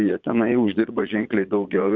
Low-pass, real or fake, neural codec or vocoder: 7.2 kHz; fake; vocoder, 22.05 kHz, 80 mel bands, Vocos